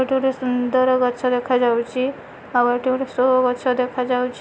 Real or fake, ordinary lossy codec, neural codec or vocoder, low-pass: real; none; none; none